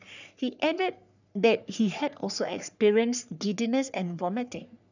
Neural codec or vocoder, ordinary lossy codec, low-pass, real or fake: codec, 44.1 kHz, 3.4 kbps, Pupu-Codec; none; 7.2 kHz; fake